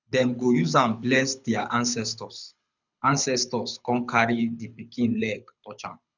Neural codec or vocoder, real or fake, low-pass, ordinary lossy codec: codec, 24 kHz, 6 kbps, HILCodec; fake; 7.2 kHz; none